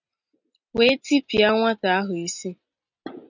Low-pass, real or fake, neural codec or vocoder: 7.2 kHz; real; none